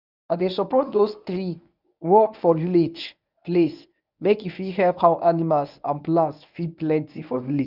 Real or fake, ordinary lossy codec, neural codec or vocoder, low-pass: fake; none; codec, 24 kHz, 0.9 kbps, WavTokenizer, medium speech release version 1; 5.4 kHz